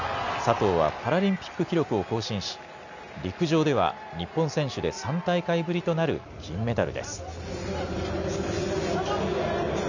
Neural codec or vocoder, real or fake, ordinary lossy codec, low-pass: none; real; none; 7.2 kHz